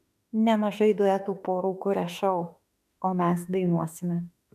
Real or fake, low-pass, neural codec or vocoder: fake; 14.4 kHz; autoencoder, 48 kHz, 32 numbers a frame, DAC-VAE, trained on Japanese speech